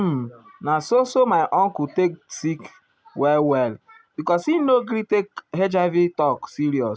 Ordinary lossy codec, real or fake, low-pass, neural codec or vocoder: none; real; none; none